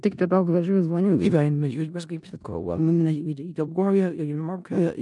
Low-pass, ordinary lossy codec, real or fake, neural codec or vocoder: 10.8 kHz; MP3, 96 kbps; fake; codec, 16 kHz in and 24 kHz out, 0.4 kbps, LongCat-Audio-Codec, four codebook decoder